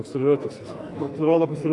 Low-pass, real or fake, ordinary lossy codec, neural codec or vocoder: 10.8 kHz; fake; AAC, 48 kbps; codec, 44.1 kHz, 2.6 kbps, SNAC